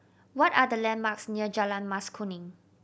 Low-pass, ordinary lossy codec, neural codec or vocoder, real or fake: none; none; none; real